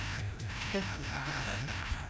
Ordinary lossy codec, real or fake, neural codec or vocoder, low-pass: none; fake; codec, 16 kHz, 0.5 kbps, FreqCodec, larger model; none